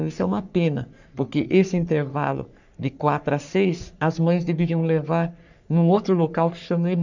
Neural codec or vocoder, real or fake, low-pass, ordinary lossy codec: codec, 44.1 kHz, 3.4 kbps, Pupu-Codec; fake; 7.2 kHz; none